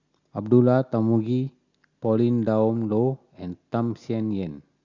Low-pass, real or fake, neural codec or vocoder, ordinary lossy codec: 7.2 kHz; real; none; Opus, 64 kbps